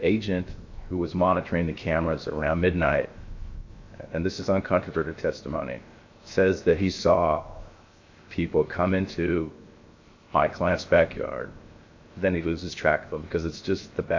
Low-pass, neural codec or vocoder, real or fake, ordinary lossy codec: 7.2 kHz; codec, 16 kHz, 0.7 kbps, FocalCodec; fake; MP3, 48 kbps